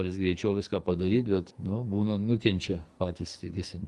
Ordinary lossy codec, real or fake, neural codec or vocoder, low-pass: Opus, 32 kbps; fake; codec, 44.1 kHz, 2.6 kbps, SNAC; 10.8 kHz